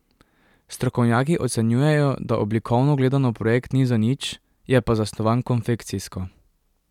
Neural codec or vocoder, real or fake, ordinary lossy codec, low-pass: none; real; none; 19.8 kHz